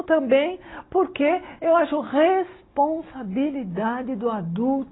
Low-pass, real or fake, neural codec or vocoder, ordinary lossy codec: 7.2 kHz; real; none; AAC, 16 kbps